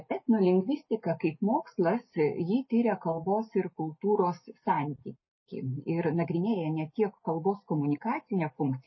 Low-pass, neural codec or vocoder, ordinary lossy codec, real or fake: 7.2 kHz; none; MP3, 24 kbps; real